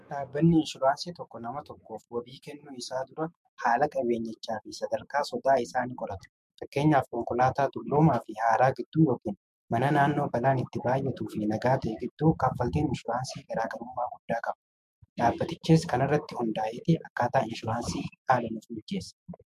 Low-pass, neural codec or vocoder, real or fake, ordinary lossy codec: 14.4 kHz; autoencoder, 48 kHz, 128 numbers a frame, DAC-VAE, trained on Japanese speech; fake; MP3, 64 kbps